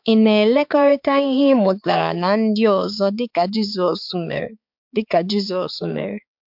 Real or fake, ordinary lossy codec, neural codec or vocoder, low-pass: fake; MP3, 48 kbps; codec, 16 kHz, 4 kbps, X-Codec, HuBERT features, trained on balanced general audio; 5.4 kHz